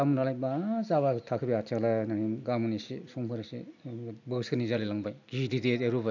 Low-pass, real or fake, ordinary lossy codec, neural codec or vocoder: 7.2 kHz; real; none; none